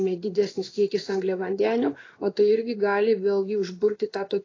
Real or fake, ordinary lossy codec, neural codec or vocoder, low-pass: fake; AAC, 32 kbps; codec, 16 kHz in and 24 kHz out, 1 kbps, XY-Tokenizer; 7.2 kHz